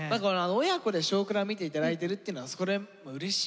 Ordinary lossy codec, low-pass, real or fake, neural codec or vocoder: none; none; real; none